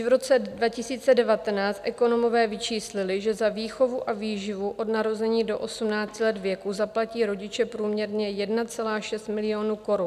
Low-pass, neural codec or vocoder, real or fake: 14.4 kHz; none; real